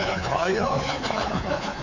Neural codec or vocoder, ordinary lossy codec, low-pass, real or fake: codec, 24 kHz, 3.1 kbps, DualCodec; none; 7.2 kHz; fake